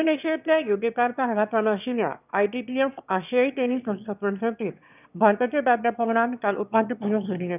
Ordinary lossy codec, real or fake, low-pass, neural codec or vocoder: none; fake; 3.6 kHz; autoencoder, 22.05 kHz, a latent of 192 numbers a frame, VITS, trained on one speaker